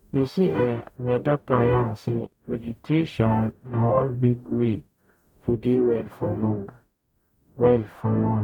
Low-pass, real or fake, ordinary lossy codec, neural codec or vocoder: 19.8 kHz; fake; none; codec, 44.1 kHz, 0.9 kbps, DAC